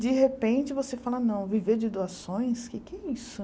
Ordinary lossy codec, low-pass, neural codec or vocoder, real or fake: none; none; none; real